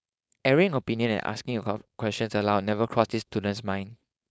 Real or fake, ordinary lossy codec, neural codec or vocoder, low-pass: fake; none; codec, 16 kHz, 4.8 kbps, FACodec; none